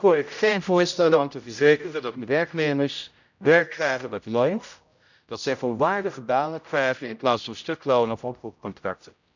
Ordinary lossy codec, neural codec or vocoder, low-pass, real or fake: none; codec, 16 kHz, 0.5 kbps, X-Codec, HuBERT features, trained on general audio; 7.2 kHz; fake